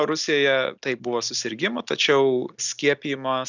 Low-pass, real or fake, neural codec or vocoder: 7.2 kHz; real; none